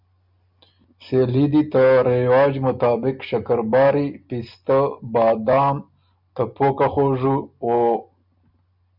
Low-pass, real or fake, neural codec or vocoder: 5.4 kHz; real; none